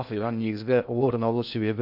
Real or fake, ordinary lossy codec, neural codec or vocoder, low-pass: fake; none; codec, 16 kHz in and 24 kHz out, 0.6 kbps, FocalCodec, streaming, 2048 codes; 5.4 kHz